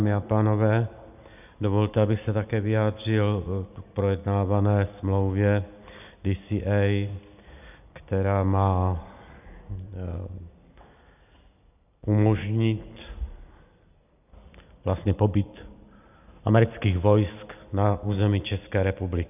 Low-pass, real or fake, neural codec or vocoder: 3.6 kHz; real; none